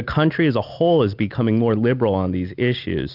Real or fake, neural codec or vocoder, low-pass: real; none; 5.4 kHz